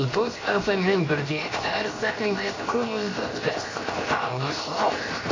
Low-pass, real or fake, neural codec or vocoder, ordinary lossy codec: 7.2 kHz; fake; codec, 16 kHz, 0.7 kbps, FocalCodec; AAC, 32 kbps